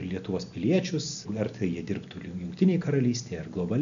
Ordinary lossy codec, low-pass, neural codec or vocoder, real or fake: MP3, 96 kbps; 7.2 kHz; none; real